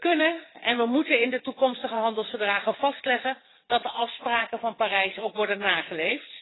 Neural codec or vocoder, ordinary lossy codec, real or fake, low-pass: vocoder, 22.05 kHz, 80 mel bands, WaveNeXt; AAC, 16 kbps; fake; 7.2 kHz